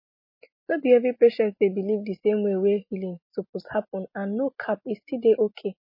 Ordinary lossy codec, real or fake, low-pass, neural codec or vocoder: MP3, 24 kbps; real; 5.4 kHz; none